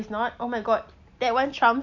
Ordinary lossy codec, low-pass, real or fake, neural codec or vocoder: none; 7.2 kHz; real; none